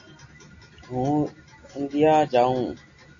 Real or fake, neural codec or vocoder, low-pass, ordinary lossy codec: real; none; 7.2 kHz; AAC, 48 kbps